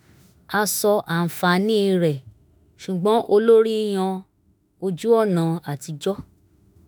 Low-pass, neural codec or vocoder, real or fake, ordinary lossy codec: none; autoencoder, 48 kHz, 32 numbers a frame, DAC-VAE, trained on Japanese speech; fake; none